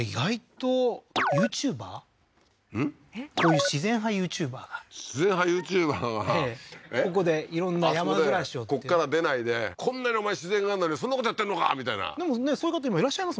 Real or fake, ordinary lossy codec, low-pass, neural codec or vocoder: real; none; none; none